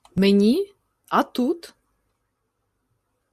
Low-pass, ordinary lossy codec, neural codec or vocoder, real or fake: 14.4 kHz; Opus, 64 kbps; none; real